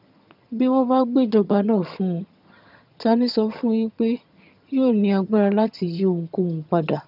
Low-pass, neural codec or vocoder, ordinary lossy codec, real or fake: 5.4 kHz; vocoder, 22.05 kHz, 80 mel bands, HiFi-GAN; none; fake